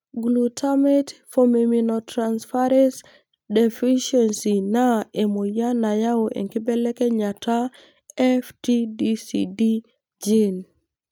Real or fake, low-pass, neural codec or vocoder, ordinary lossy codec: real; none; none; none